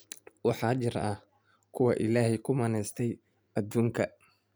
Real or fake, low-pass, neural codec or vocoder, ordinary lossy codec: real; none; none; none